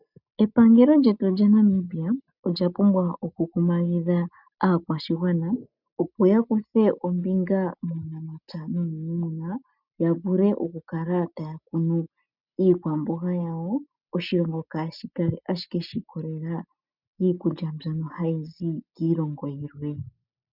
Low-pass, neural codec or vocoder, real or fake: 5.4 kHz; none; real